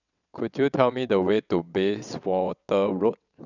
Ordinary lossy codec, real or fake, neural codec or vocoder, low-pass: none; fake; vocoder, 22.05 kHz, 80 mel bands, WaveNeXt; 7.2 kHz